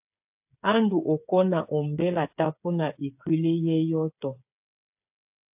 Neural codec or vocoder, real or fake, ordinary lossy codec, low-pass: codec, 16 kHz, 8 kbps, FreqCodec, smaller model; fake; AAC, 32 kbps; 3.6 kHz